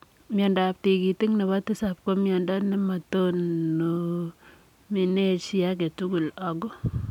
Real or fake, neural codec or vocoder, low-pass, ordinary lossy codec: real; none; 19.8 kHz; none